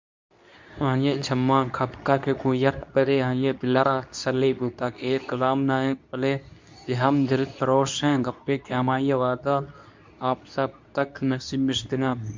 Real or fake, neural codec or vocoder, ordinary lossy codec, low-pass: fake; codec, 24 kHz, 0.9 kbps, WavTokenizer, medium speech release version 2; MP3, 64 kbps; 7.2 kHz